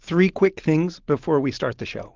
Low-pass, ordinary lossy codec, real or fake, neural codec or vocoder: 7.2 kHz; Opus, 16 kbps; real; none